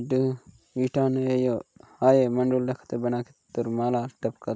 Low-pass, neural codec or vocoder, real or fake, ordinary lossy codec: none; none; real; none